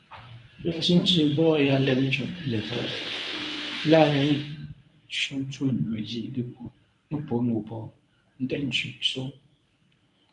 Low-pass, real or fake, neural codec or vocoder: 10.8 kHz; fake; codec, 24 kHz, 0.9 kbps, WavTokenizer, medium speech release version 1